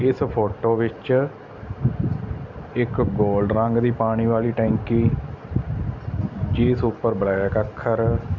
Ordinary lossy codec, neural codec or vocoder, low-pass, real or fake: none; none; 7.2 kHz; real